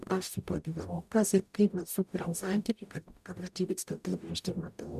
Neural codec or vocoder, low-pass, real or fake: codec, 44.1 kHz, 0.9 kbps, DAC; 14.4 kHz; fake